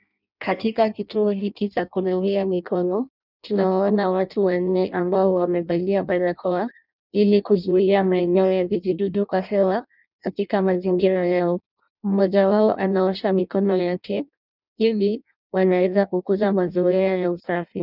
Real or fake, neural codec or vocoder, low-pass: fake; codec, 16 kHz in and 24 kHz out, 0.6 kbps, FireRedTTS-2 codec; 5.4 kHz